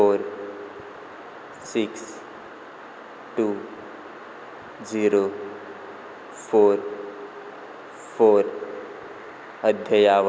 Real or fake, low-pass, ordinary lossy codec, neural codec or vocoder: real; none; none; none